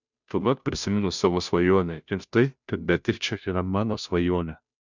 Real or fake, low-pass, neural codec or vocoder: fake; 7.2 kHz; codec, 16 kHz, 0.5 kbps, FunCodec, trained on Chinese and English, 25 frames a second